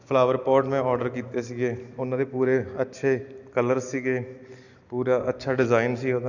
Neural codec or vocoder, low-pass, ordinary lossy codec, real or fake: none; 7.2 kHz; none; real